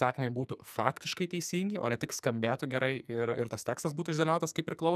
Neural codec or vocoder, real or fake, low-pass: codec, 44.1 kHz, 2.6 kbps, SNAC; fake; 14.4 kHz